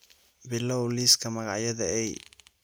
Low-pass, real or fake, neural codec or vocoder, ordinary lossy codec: none; real; none; none